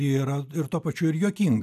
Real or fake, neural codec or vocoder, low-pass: real; none; 14.4 kHz